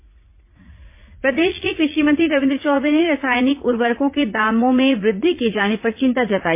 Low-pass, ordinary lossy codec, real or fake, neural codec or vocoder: 3.6 kHz; MP3, 24 kbps; fake; vocoder, 44.1 kHz, 80 mel bands, Vocos